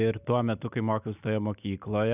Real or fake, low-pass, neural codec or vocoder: real; 3.6 kHz; none